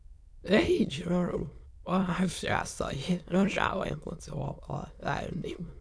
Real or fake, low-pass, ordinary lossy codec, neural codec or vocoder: fake; none; none; autoencoder, 22.05 kHz, a latent of 192 numbers a frame, VITS, trained on many speakers